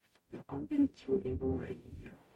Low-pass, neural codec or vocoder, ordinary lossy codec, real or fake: 19.8 kHz; codec, 44.1 kHz, 0.9 kbps, DAC; MP3, 64 kbps; fake